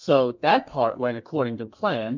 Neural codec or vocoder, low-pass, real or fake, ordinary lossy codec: codec, 32 kHz, 1.9 kbps, SNAC; 7.2 kHz; fake; MP3, 64 kbps